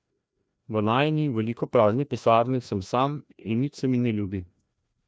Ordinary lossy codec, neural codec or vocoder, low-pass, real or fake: none; codec, 16 kHz, 1 kbps, FreqCodec, larger model; none; fake